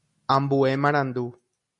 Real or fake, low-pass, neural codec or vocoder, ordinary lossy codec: real; 10.8 kHz; none; MP3, 48 kbps